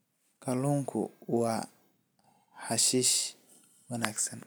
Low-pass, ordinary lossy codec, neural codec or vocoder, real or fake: none; none; none; real